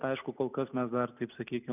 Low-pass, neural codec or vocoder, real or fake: 3.6 kHz; none; real